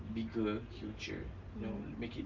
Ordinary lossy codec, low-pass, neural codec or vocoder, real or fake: Opus, 24 kbps; 7.2 kHz; none; real